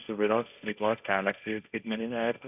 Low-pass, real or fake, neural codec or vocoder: 3.6 kHz; fake; codec, 16 kHz, 1.1 kbps, Voila-Tokenizer